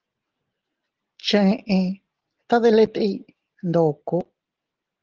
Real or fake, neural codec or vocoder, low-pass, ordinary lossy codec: real; none; 7.2 kHz; Opus, 16 kbps